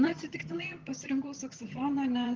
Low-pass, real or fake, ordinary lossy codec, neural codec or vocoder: 7.2 kHz; fake; Opus, 16 kbps; vocoder, 22.05 kHz, 80 mel bands, HiFi-GAN